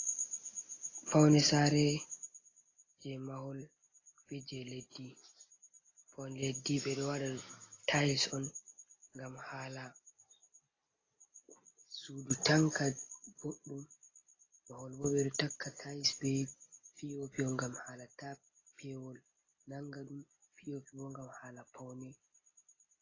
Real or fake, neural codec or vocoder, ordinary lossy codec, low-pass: real; none; AAC, 32 kbps; 7.2 kHz